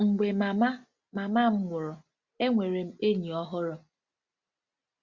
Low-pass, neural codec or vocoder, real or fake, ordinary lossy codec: 7.2 kHz; none; real; Opus, 64 kbps